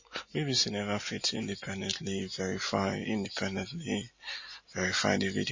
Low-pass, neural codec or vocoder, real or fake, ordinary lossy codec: 7.2 kHz; none; real; MP3, 32 kbps